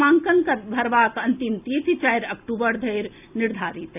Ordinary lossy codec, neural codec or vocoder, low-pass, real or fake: AAC, 32 kbps; none; 3.6 kHz; real